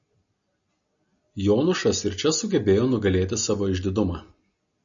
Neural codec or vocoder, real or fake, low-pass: none; real; 7.2 kHz